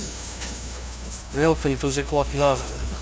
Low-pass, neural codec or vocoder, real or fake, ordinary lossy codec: none; codec, 16 kHz, 0.5 kbps, FunCodec, trained on LibriTTS, 25 frames a second; fake; none